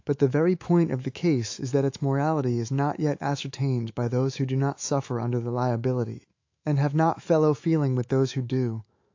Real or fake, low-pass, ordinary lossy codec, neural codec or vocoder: fake; 7.2 kHz; AAC, 48 kbps; autoencoder, 48 kHz, 128 numbers a frame, DAC-VAE, trained on Japanese speech